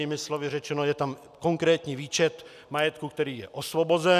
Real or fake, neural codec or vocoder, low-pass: real; none; 14.4 kHz